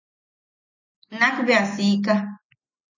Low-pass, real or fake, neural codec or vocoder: 7.2 kHz; real; none